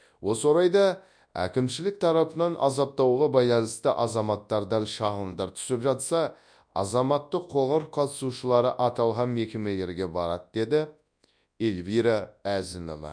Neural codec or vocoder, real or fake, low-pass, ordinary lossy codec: codec, 24 kHz, 0.9 kbps, WavTokenizer, large speech release; fake; 9.9 kHz; none